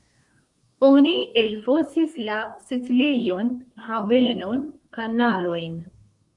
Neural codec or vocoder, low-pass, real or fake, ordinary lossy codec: codec, 24 kHz, 1 kbps, SNAC; 10.8 kHz; fake; MP3, 64 kbps